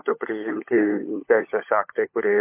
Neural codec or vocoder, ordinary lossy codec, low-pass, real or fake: codec, 16 kHz, 16 kbps, FunCodec, trained on LibriTTS, 50 frames a second; MP3, 24 kbps; 3.6 kHz; fake